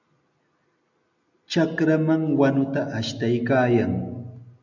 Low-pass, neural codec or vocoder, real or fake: 7.2 kHz; none; real